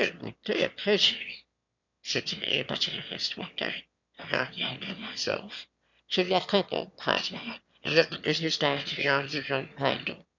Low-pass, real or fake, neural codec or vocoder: 7.2 kHz; fake; autoencoder, 22.05 kHz, a latent of 192 numbers a frame, VITS, trained on one speaker